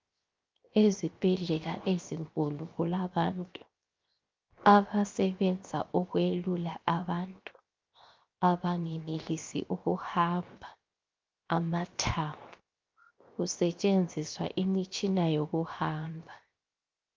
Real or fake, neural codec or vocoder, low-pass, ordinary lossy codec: fake; codec, 16 kHz, 0.7 kbps, FocalCodec; 7.2 kHz; Opus, 24 kbps